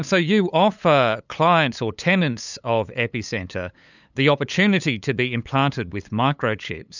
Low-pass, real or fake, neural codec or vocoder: 7.2 kHz; fake; codec, 16 kHz, 8 kbps, FunCodec, trained on LibriTTS, 25 frames a second